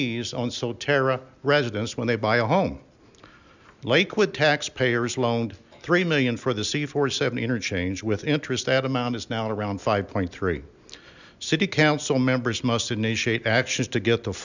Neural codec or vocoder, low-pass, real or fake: none; 7.2 kHz; real